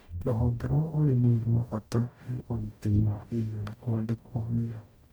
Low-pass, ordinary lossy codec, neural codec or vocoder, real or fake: none; none; codec, 44.1 kHz, 0.9 kbps, DAC; fake